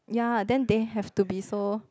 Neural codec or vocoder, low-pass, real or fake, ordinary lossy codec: none; none; real; none